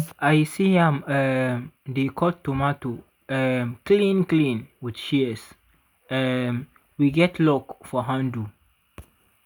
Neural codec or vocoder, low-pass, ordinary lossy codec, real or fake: none; none; none; real